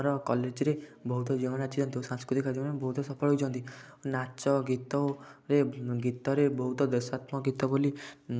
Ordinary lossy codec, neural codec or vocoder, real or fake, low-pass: none; none; real; none